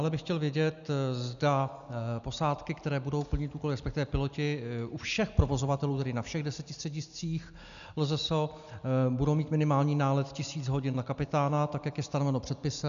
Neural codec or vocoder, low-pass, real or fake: none; 7.2 kHz; real